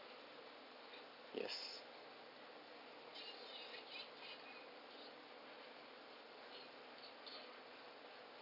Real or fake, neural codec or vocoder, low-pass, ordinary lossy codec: real; none; 5.4 kHz; none